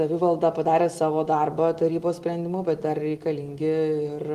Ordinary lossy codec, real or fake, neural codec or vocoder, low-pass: Opus, 24 kbps; real; none; 14.4 kHz